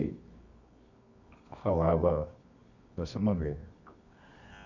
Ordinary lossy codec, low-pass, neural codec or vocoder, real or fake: none; 7.2 kHz; codec, 24 kHz, 0.9 kbps, WavTokenizer, medium music audio release; fake